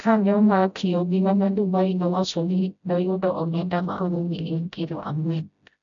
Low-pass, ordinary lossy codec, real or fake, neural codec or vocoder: 7.2 kHz; MP3, 64 kbps; fake; codec, 16 kHz, 0.5 kbps, FreqCodec, smaller model